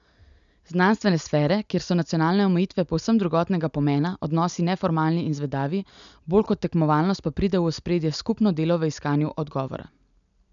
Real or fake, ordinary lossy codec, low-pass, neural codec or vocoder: real; none; 7.2 kHz; none